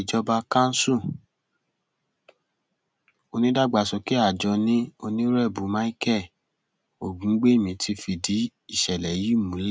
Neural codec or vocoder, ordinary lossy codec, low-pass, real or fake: none; none; none; real